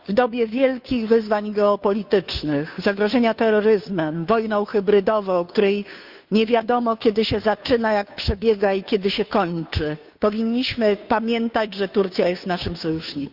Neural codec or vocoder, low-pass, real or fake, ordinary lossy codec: codec, 16 kHz, 2 kbps, FunCodec, trained on Chinese and English, 25 frames a second; 5.4 kHz; fake; Opus, 64 kbps